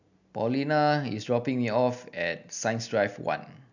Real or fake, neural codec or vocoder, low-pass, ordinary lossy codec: real; none; 7.2 kHz; none